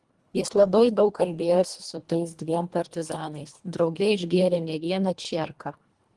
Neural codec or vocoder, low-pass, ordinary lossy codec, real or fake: codec, 24 kHz, 1.5 kbps, HILCodec; 10.8 kHz; Opus, 24 kbps; fake